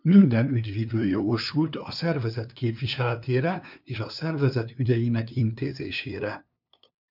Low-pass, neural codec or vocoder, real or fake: 5.4 kHz; codec, 16 kHz, 2 kbps, FunCodec, trained on LibriTTS, 25 frames a second; fake